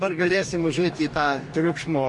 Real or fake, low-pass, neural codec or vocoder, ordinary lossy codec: fake; 10.8 kHz; codec, 44.1 kHz, 2.6 kbps, DAC; MP3, 48 kbps